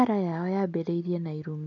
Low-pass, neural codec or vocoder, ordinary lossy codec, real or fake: 7.2 kHz; none; none; real